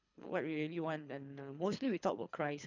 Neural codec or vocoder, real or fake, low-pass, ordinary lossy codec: codec, 24 kHz, 3 kbps, HILCodec; fake; 7.2 kHz; none